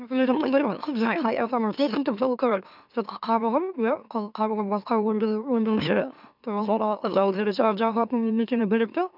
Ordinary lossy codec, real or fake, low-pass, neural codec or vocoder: none; fake; 5.4 kHz; autoencoder, 44.1 kHz, a latent of 192 numbers a frame, MeloTTS